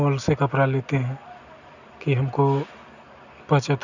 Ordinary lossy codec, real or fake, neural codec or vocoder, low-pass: none; real; none; 7.2 kHz